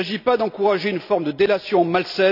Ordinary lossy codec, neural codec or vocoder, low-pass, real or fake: none; none; 5.4 kHz; real